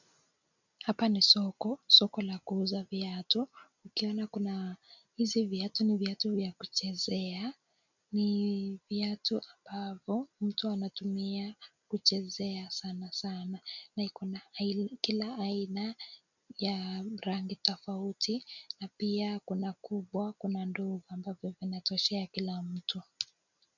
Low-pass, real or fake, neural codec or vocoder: 7.2 kHz; real; none